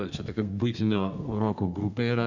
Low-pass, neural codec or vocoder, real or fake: 7.2 kHz; codec, 32 kHz, 1.9 kbps, SNAC; fake